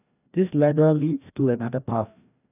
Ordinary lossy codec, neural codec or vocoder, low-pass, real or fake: none; codec, 16 kHz, 1 kbps, FreqCodec, larger model; 3.6 kHz; fake